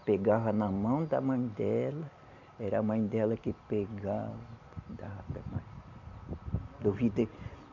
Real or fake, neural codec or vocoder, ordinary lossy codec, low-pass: real; none; none; 7.2 kHz